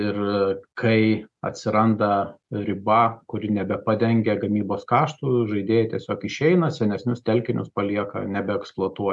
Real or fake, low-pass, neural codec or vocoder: real; 10.8 kHz; none